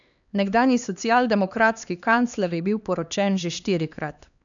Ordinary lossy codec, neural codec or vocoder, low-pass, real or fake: none; codec, 16 kHz, 2 kbps, X-Codec, HuBERT features, trained on LibriSpeech; 7.2 kHz; fake